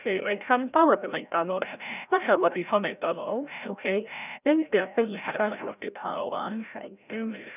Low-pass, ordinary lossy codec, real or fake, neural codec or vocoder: 3.6 kHz; none; fake; codec, 16 kHz, 0.5 kbps, FreqCodec, larger model